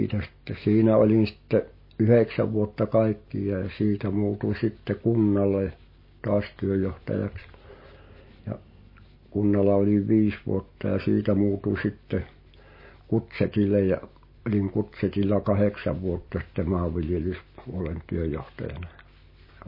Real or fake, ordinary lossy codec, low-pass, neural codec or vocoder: real; MP3, 24 kbps; 5.4 kHz; none